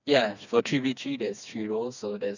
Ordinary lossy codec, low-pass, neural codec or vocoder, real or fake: none; 7.2 kHz; codec, 16 kHz, 2 kbps, FreqCodec, smaller model; fake